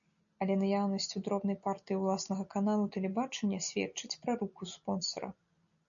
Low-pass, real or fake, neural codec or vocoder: 7.2 kHz; real; none